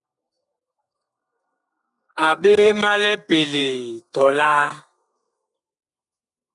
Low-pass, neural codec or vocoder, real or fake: 10.8 kHz; codec, 32 kHz, 1.9 kbps, SNAC; fake